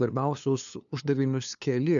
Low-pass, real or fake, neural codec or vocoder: 7.2 kHz; fake; codec, 16 kHz, 2 kbps, FunCodec, trained on LibriTTS, 25 frames a second